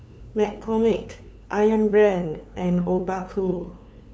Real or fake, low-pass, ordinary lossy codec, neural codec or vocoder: fake; none; none; codec, 16 kHz, 2 kbps, FunCodec, trained on LibriTTS, 25 frames a second